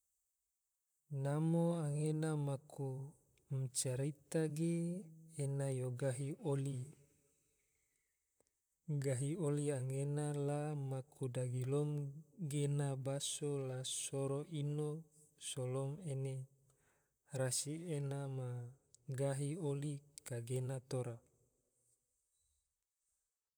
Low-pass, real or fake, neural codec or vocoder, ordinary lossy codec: none; fake; vocoder, 44.1 kHz, 128 mel bands, Pupu-Vocoder; none